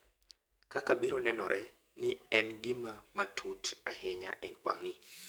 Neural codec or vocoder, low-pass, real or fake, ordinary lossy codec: codec, 44.1 kHz, 2.6 kbps, SNAC; none; fake; none